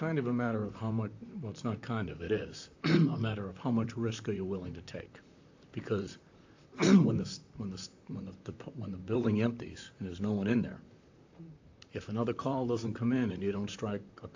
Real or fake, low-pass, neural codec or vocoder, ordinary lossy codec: fake; 7.2 kHz; vocoder, 44.1 kHz, 128 mel bands, Pupu-Vocoder; AAC, 48 kbps